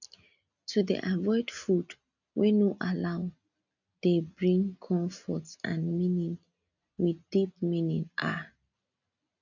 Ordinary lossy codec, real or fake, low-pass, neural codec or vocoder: none; real; 7.2 kHz; none